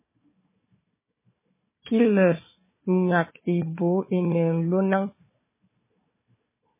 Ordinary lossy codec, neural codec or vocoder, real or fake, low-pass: MP3, 16 kbps; codec, 16 kHz, 4 kbps, FunCodec, trained on Chinese and English, 50 frames a second; fake; 3.6 kHz